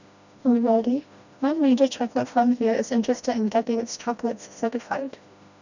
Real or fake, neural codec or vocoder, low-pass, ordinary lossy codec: fake; codec, 16 kHz, 1 kbps, FreqCodec, smaller model; 7.2 kHz; none